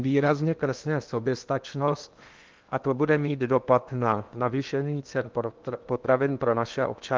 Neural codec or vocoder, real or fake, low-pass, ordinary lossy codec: codec, 16 kHz in and 24 kHz out, 0.8 kbps, FocalCodec, streaming, 65536 codes; fake; 7.2 kHz; Opus, 32 kbps